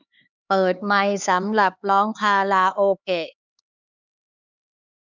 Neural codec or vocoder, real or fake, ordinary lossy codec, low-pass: codec, 16 kHz, 4 kbps, X-Codec, HuBERT features, trained on LibriSpeech; fake; none; 7.2 kHz